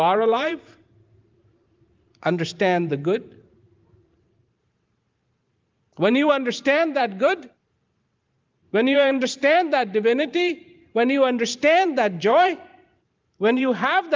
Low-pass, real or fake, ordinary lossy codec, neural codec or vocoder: 7.2 kHz; fake; Opus, 32 kbps; vocoder, 44.1 kHz, 128 mel bands every 512 samples, BigVGAN v2